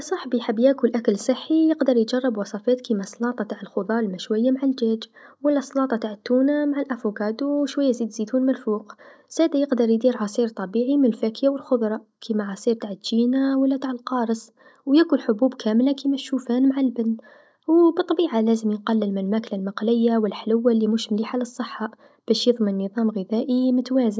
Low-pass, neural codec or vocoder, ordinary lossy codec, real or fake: none; none; none; real